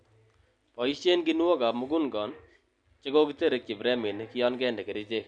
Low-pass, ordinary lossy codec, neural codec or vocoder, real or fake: 9.9 kHz; none; none; real